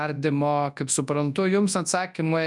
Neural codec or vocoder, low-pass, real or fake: codec, 24 kHz, 0.9 kbps, WavTokenizer, large speech release; 10.8 kHz; fake